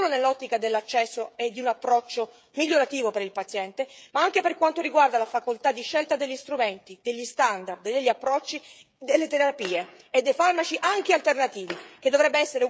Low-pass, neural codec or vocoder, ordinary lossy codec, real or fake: none; codec, 16 kHz, 16 kbps, FreqCodec, smaller model; none; fake